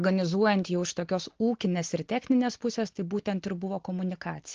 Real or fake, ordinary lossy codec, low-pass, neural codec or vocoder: real; Opus, 16 kbps; 7.2 kHz; none